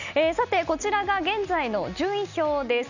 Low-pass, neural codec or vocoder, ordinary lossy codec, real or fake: 7.2 kHz; none; none; real